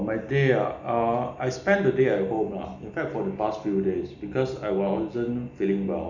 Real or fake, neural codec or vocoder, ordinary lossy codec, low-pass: real; none; none; 7.2 kHz